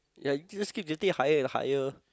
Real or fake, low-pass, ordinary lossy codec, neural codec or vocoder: real; none; none; none